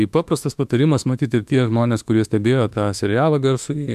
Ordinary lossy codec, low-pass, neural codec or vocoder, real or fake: MP3, 96 kbps; 14.4 kHz; autoencoder, 48 kHz, 32 numbers a frame, DAC-VAE, trained on Japanese speech; fake